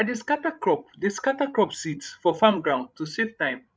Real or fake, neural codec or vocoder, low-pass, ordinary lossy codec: fake; codec, 16 kHz, 16 kbps, FreqCodec, larger model; none; none